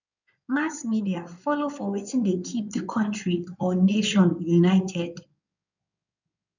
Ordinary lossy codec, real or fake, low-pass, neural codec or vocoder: none; fake; 7.2 kHz; codec, 16 kHz in and 24 kHz out, 2.2 kbps, FireRedTTS-2 codec